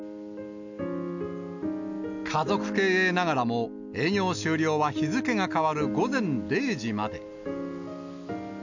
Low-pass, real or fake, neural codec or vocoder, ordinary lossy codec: 7.2 kHz; real; none; none